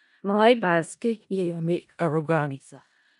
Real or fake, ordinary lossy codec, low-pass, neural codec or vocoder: fake; none; 10.8 kHz; codec, 16 kHz in and 24 kHz out, 0.4 kbps, LongCat-Audio-Codec, four codebook decoder